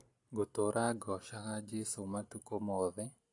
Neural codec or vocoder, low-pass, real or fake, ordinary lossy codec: none; 10.8 kHz; real; AAC, 48 kbps